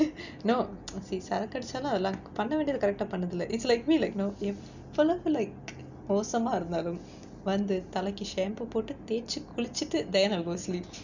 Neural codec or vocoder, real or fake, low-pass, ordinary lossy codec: none; real; 7.2 kHz; none